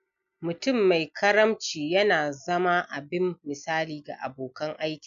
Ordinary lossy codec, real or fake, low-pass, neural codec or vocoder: MP3, 64 kbps; real; 7.2 kHz; none